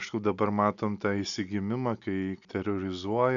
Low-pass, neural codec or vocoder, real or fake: 7.2 kHz; none; real